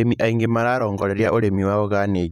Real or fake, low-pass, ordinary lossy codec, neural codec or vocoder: fake; 19.8 kHz; none; vocoder, 44.1 kHz, 128 mel bands, Pupu-Vocoder